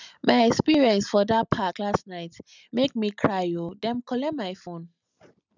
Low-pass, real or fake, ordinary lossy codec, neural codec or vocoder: 7.2 kHz; real; none; none